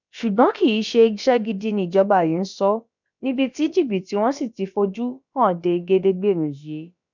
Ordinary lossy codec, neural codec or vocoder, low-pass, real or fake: none; codec, 16 kHz, about 1 kbps, DyCAST, with the encoder's durations; 7.2 kHz; fake